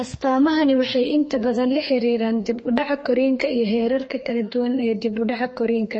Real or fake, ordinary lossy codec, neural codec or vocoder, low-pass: fake; MP3, 32 kbps; codec, 32 kHz, 1.9 kbps, SNAC; 9.9 kHz